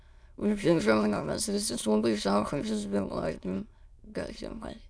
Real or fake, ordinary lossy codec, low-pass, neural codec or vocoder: fake; none; none; autoencoder, 22.05 kHz, a latent of 192 numbers a frame, VITS, trained on many speakers